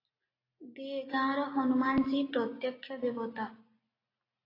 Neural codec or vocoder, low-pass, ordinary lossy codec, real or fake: none; 5.4 kHz; AAC, 24 kbps; real